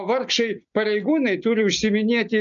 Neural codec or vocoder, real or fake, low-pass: none; real; 7.2 kHz